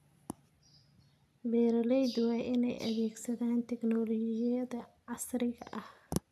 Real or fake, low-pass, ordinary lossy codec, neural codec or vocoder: real; 14.4 kHz; none; none